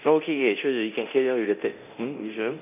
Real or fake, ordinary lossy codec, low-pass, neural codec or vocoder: fake; none; 3.6 kHz; codec, 24 kHz, 0.9 kbps, DualCodec